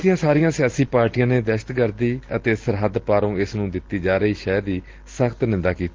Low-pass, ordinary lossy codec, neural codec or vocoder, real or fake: 7.2 kHz; Opus, 16 kbps; none; real